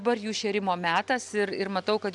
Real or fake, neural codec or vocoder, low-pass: real; none; 10.8 kHz